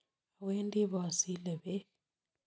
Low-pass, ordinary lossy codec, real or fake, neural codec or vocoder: none; none; real; none